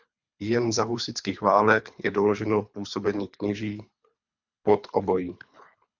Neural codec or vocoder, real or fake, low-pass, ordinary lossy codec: codec, 24 kHz, 3 kbps, HILCodec; fake; 7.2 kHz; MP3, 64 kbps